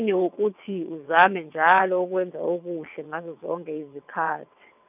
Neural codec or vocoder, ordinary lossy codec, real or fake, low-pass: vocoder, 22.05 kHz, 80 mel bands, WaveNeXt; none; fake; 3.6 kHz